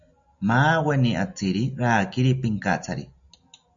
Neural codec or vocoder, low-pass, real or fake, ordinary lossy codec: none; 7.2 kHz; real; MP3, 64 kbps